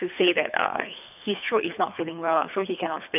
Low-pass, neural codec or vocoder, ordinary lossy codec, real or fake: 3.6 kHz; codec, 24 kHz, 3 kbps, HILCodec; none; fake